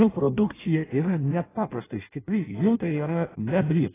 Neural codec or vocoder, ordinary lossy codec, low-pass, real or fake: codec, 16 kHz in and 24 kHz out, 0.6 kbps, FireRedTTS-2 codec; AAC, 16 kbps; 3.6 kHz; fake